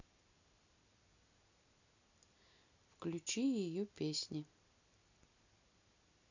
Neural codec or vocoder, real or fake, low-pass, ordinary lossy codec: none; real; 7.2 kHz; none